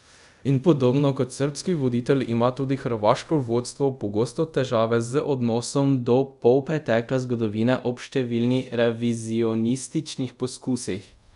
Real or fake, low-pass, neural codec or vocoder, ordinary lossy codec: fake; 10.8 kHz; codec, 24 kHz, 0.5 kbps, DualCodec; none